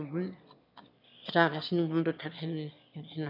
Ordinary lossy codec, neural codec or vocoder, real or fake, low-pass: none; autoencoder, 22.05 kHz, a latent of 192 numbers a frame, VITS, trained on one speaker; fake; 5.4 kHz